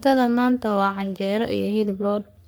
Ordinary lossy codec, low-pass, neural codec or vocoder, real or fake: none; none; codec, 44.1 kHz, 3.4 kbps, Pupu-Codec; fake